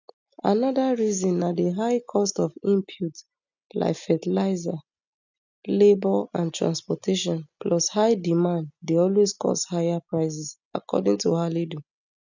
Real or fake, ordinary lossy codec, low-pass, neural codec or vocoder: real; none; 7.2 kHz; none